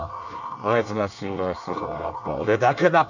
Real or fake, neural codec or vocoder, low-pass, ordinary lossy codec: fake; codec, 24 kHz, 1 kbps, SNAC; 7.2 kHz; none